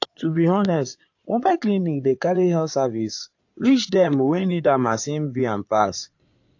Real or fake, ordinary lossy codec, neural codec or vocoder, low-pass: fake; AAC, 48 kbps; codec, 16 kHz in and 24 kHz out, 2.2 kbps, FireRedTTS-2 codec; 7.2 kHz